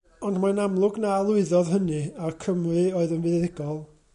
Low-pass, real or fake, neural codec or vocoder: 10.8 kHz; real; none